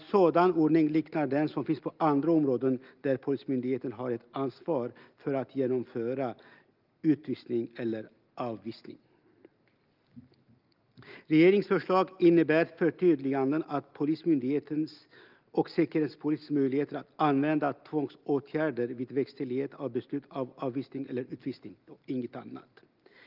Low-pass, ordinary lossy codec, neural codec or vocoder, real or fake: 5.4 kHz; Opus, 32 kbps; none; real